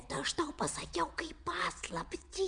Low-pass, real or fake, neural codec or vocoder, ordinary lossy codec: 9.9 kHz; fake; vocoder, 22.05 kHz, 80 mel bands, WaveNeXt; MP3, 96 kbps